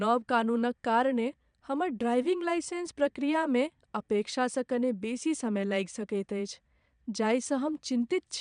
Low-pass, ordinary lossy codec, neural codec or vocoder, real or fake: 9.9 kHz; none; vocoder, 22.05 kHz, 80 mel bands, Vocos; fake